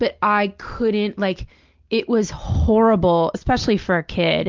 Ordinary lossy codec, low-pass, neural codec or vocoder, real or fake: Opus, 24 kbps; 7.2 kHz; none; real